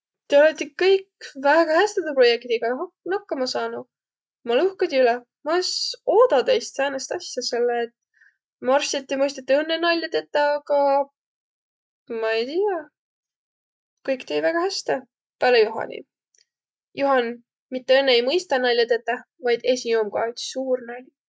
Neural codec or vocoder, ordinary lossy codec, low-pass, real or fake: none; none; none; real